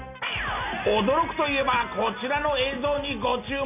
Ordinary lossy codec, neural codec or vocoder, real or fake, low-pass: none; none; real; 3.6 kHz